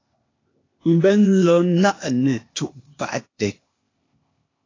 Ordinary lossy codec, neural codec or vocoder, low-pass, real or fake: AAC, 32 kbps; codec, 16 kHz, 0.8 kbps, ZipCodec; 7.2 kHz; fake